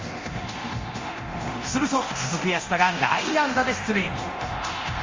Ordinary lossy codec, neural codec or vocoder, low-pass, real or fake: Opus, 32 kbps; codec, 24 kHz, 0.9 kbps, DualCodec; 7.2 kHz; fake